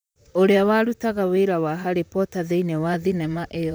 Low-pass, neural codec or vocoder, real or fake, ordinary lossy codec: none; vocoder, 44.1 kHz, 128 mel bands, Pupu-Vocoder; fake; none